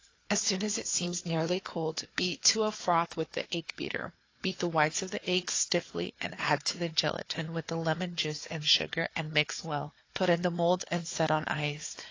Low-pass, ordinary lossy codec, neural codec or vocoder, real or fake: 7.2 kHz; AAC, 32 kbps; codec, 16 kHz, 4 kbps, FunCodec, trained on Chinese and English, 50 frames a second; fake